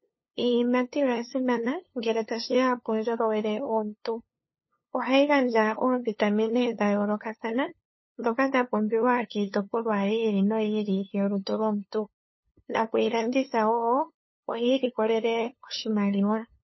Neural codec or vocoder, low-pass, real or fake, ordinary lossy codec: codec, 16 kHz, 2 kbps, FunCodec, trained on LibriTTS, 25 frames a second; 7.2 kHz; fake; MP3, 24 kbps